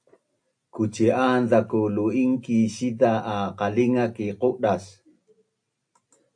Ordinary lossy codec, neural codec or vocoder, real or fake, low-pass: AAC, 64 kbps; none; real; 9.9 kHz